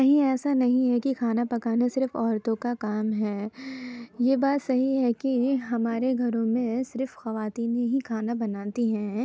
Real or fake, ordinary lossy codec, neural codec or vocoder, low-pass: real; none; none; none